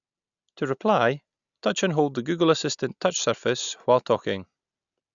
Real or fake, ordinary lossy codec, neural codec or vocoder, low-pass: real; none; none; 7.2 kHz